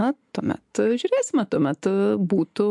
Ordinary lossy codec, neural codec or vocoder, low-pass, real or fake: MP3, 64 kbps; none; 10.8 kHz; real